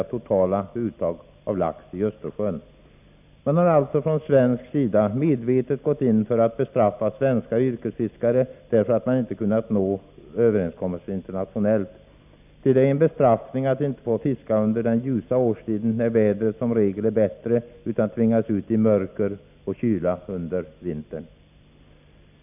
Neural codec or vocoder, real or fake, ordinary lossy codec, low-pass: none; real; none; 3.6 kHz